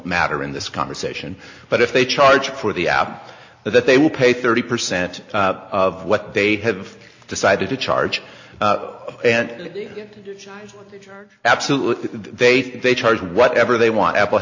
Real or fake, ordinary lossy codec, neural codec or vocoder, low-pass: real; AAC, 48 kbps; none; 7.2 kHz